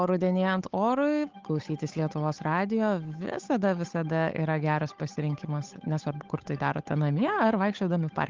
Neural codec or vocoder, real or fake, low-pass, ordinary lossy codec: codec, 16 kHz, 8 kbps, FunCodec, trained on Chinese and English, 25 frames a second; fake; 7.2 kHz; Opus, 32 kbps